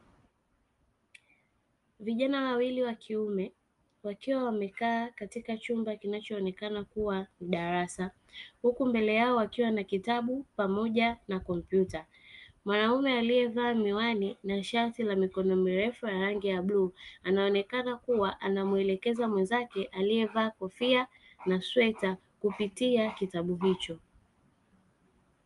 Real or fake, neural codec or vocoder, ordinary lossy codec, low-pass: real; none; Opus, 32 kbps; 10.8 kHz